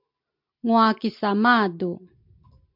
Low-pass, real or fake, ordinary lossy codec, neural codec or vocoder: 5.4 kHz; real; MP3, 48 kbps; none